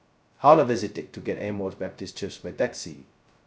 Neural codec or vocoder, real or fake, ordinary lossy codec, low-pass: codec, 16 kHz, 0.2 kbps, FocalCodec; fake; none; none